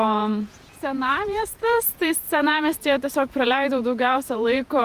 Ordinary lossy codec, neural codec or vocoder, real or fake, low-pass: Opus, 32 kbps; vocoder, 48 kHz, 128 mel bands, Vocos; fake; 14.4 kHz